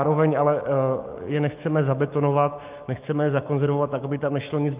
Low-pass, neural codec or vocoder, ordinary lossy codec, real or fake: 3.6 kHz; autoencoder, 48 kHz, 128 numbers a frame, DAC-VAE, trained on Japanese speech; Opus, 32 kbps; fake